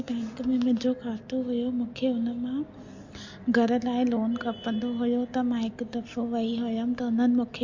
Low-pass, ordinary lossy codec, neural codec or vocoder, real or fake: 7.2 kHz; MP3, 48 kbps; none; real